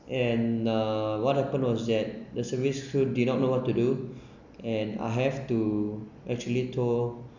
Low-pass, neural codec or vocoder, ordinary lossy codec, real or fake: 7.2 kHz; none; none; real